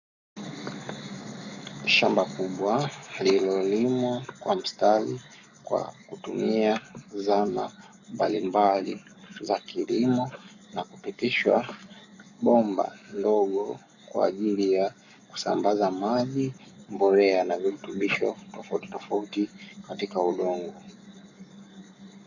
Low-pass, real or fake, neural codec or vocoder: 7.2 kHz; fake; codec, 44.1 kHz, 7.8 kbps, Pupu-Codec